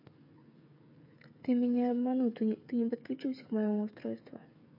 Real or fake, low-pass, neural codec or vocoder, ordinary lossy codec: fake; 5.4 kHz; codec, 16 kHz, 8 kbps, FreqCodec, smaller model; MP3, 32 kbps